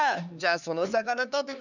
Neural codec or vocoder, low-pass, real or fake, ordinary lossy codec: codec, 16 kHz, 4 kbps, X-Codec, HuBERT features, trained on LibriSpeech; 7.2 kHz; fake; none